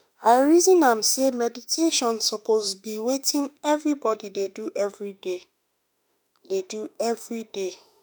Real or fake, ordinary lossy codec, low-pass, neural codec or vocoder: fake; none; none; autoencoder, 48 kHz, 32 numbers a frame, DAC-VAE, trained on Japanese speech